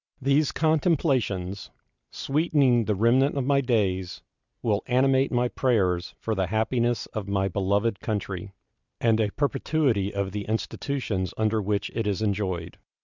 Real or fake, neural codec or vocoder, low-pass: real; none; 7.2 kHz